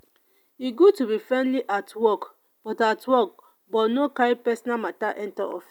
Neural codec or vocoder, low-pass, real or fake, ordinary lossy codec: none; 19.8 kHz; real; none